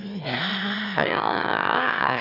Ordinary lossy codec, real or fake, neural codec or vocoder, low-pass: none; fake; autoencoder, 22.05 kHz, a latent of 192 numbers a frame, VITS, trained on one speaker; 5.4 kHz